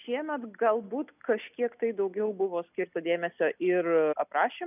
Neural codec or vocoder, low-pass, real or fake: none; 3.6 kHz; real